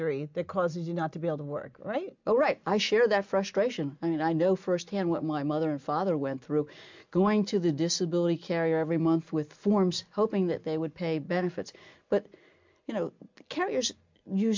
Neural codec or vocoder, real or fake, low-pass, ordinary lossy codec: none; real; 7.2 kHz; MP3, 64 kbps